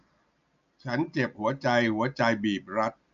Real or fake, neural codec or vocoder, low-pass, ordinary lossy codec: real; none; 7.2 kHz; none